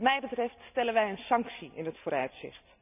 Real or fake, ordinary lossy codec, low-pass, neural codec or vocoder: real; none; 3.6 kHz; none